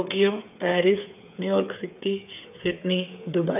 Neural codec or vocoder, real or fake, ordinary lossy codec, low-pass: codec, 16 kHz, 8 kbps, FreqCodec, smaller model; fake; none; 3.6 kHz